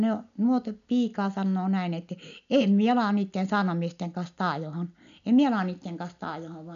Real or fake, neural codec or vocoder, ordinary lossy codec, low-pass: real; none; none; 7.2 kHz